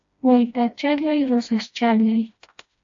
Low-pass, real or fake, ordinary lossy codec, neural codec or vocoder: 7.2 kHz; fake; AAC, 64 kbps; codec, 16 kHz, 1 kbps, FreqCodec, smaller model